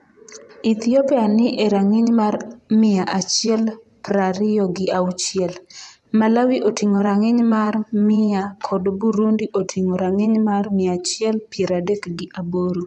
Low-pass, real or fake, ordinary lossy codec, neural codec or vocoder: none; fake; none; vocoder, 24 kHz, 100 mel bands, Vocos